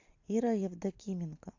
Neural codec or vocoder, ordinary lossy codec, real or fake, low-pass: none; AAC, 48 kbps; real; 7.2 kHz